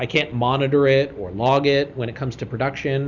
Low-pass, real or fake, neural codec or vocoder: 7.2 kHz; real; none